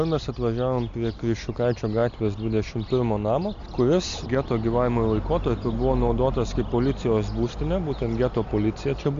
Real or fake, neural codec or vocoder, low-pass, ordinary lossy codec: real; none; 7.2 kHz; AAC, 64 kbps